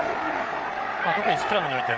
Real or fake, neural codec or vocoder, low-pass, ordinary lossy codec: fake; codec, 16 kHz, 8 kbps, FreqCodec, larger model; none; none